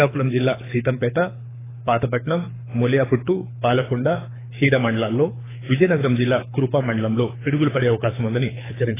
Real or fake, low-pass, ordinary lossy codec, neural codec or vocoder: fake; 3.6 kHz; AAC, 16 kbps; codec, 24 kHz, 6 kbps, HILCodec